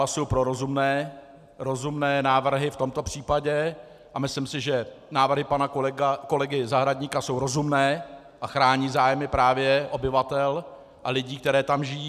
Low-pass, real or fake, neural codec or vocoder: 14.4 kHz; real; none